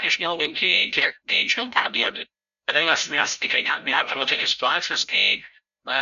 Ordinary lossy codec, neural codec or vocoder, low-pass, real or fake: AAC, 96 kbps; codec, 16 kHz, 0.5 kbps, FreqCodec, larger model; 7.2 kHz; fake